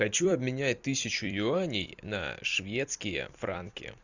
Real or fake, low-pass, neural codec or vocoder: fake; 7.2 kHz; vocoder, 44.1 kHz, 128 mel bands every 256 samples, BigVGAN v2